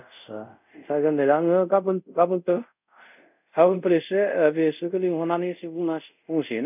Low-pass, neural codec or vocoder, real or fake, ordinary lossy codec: 3.6 kHz; codec, 24 kHz, 0.5 kbps, DualCodec; fake; none